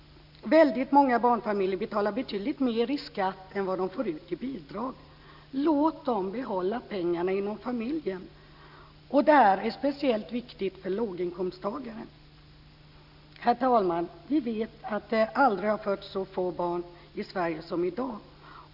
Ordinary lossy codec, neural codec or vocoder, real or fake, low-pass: none; none; real; 5.4 kHz